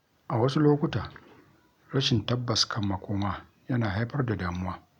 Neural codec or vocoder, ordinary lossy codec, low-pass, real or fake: none; none; 19.8 kHz; real